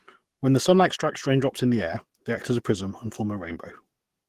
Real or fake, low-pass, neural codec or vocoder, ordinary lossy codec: fake; 14.4 kHz; codec, 44.1 kHz, 7.8 kbps, DAC; Opus, 16 kbps